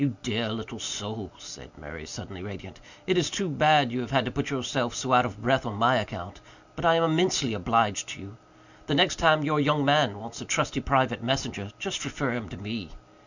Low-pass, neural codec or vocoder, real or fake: 7.2 kHz; none; real